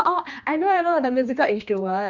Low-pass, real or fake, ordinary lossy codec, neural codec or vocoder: 7.2 kHz; fake; none; codec, 16 kHz, 2 kbps, X-Codec, HuBERT features, trained on general audio